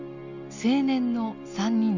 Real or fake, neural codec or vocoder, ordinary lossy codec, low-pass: real; none; none; 7.2 kHz